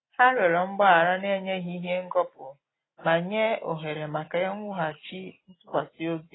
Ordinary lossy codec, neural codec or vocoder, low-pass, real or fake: AAC, 16 kbps; none; 7.2 kHz; real